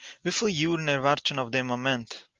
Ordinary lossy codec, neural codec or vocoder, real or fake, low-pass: Opus, 32 kbps; none; real; 7.2 kHz